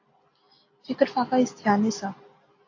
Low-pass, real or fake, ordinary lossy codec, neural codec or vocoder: 7.2 kHz; real; AAC, 32 kbps; none